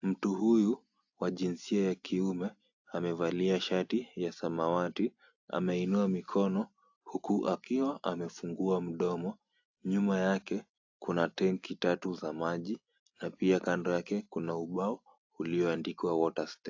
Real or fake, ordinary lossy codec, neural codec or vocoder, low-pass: real; AAC, 48 kbps; none; 7.2 kHz